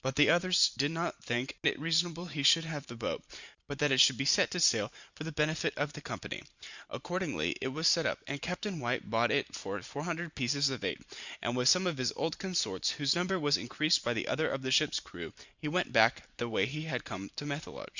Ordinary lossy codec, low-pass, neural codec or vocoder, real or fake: Opus, 64 kbps; 7.2 kHz; vocoder, 44.1 kHz, 128 mel bands every 512 samples, BigVGAN v2; fake